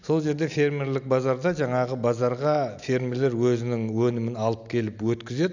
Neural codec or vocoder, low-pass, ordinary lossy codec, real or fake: none; 7.2 kHz; none; real